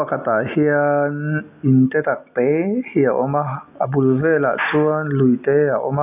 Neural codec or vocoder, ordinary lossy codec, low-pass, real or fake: none; AAC, 32 kbps; 3.6 kHz; real